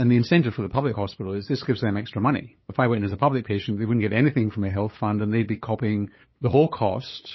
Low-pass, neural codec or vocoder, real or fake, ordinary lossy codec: 7.2 kHz; codec, 16 kHz, 8 kbps, FunCodec, trained on LibriTTS, 25 frames a second; fake; MP3, 24 kbps